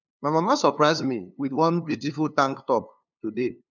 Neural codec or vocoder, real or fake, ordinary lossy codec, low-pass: codec, 16 kHz, 2 kbps, FunCodec, trained on LibriTTS, 25 frames a second; fake; none; 7.2 kHz